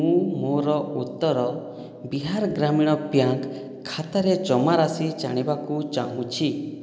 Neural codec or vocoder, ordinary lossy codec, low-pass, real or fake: none; none; none; real